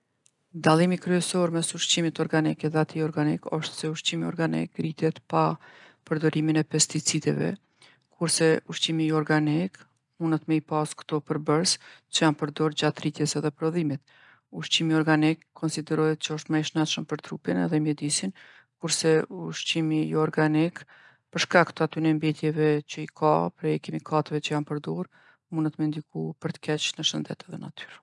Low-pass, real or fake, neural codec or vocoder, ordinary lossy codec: 10.8 kHz; real; none; none